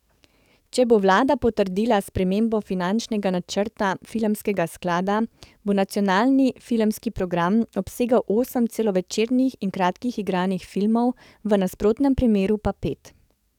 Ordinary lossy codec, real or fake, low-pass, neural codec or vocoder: none; fake; 19.8 kHz; codec, 44.1 kHz, 7.8 kbps, DAC